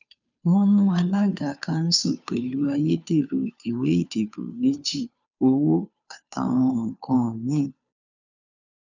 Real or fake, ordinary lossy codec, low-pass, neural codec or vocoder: fake; none; 7.2 kHz; codec, 16 kHz, 2 kbps, FunCodec, trained on Chinese and English, 25 frames a second